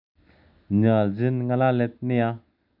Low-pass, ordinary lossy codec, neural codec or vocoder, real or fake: 5.4 kHz; none; none; real